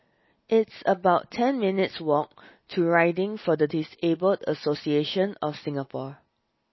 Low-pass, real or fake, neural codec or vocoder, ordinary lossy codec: 7.2 kHz; real; none; MP3, 24 kbps